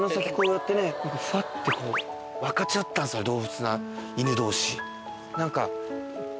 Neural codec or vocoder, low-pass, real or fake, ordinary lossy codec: none; none; real; none